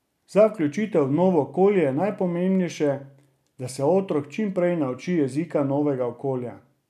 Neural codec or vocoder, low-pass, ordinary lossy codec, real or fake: none; 14.4 kHz; none; real